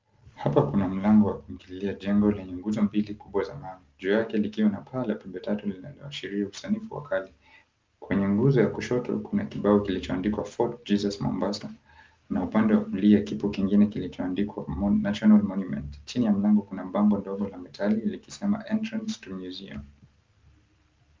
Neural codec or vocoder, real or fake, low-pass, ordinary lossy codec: none; real; 7.2 kHz; Opus, 32 kbps